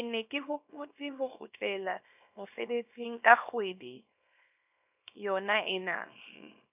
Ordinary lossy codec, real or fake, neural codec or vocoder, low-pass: none; fake; codec, 24 kHz, 0.9 kbps, WavTokenizer, small release; 3.6 kHz